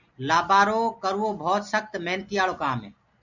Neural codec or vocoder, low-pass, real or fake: none; 7.2 kHz; real